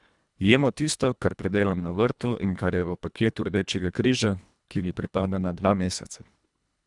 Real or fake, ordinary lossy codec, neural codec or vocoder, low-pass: fake; none; codec, 24 kHz, 1.5 kbps, HILCodec; 10.8 kHz